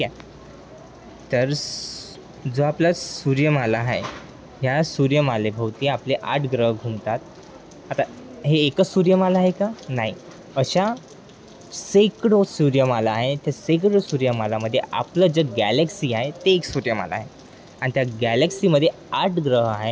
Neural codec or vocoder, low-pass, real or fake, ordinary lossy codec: none; none; real; none